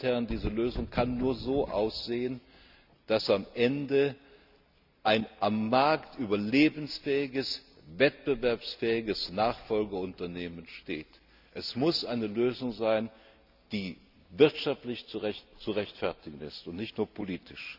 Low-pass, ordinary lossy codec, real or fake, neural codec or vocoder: 5.4 kHz; none; real; none